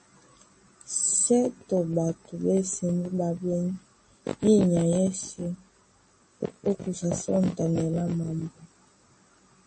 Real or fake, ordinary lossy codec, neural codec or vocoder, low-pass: real; MP3, 32 kbps; none; 9.9 kHz